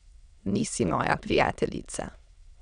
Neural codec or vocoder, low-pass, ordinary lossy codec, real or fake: autoencoder, 22.05 kHz, a latent of 192 numbers a frame, VITS, trained on many speakers; 9.9 kHz; none; fake